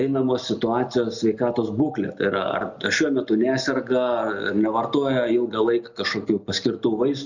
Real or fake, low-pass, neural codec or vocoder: real; 7.2 kHz; none